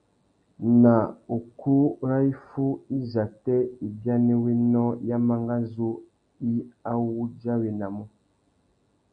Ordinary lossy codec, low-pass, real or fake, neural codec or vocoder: AAC, 64 kbps; 9.9 kHz; real; none